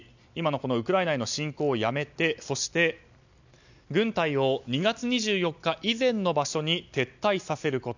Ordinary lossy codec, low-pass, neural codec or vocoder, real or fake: none; 7.2 kHz; none; real